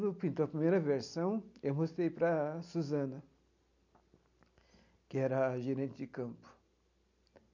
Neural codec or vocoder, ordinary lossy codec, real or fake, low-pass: none; none; real; 7.2 kHz